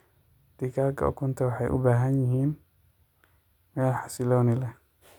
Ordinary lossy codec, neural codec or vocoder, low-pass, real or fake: none; none; 19.8 kHz; real